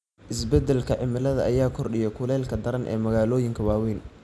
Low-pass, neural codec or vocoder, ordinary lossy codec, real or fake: none; none; none; real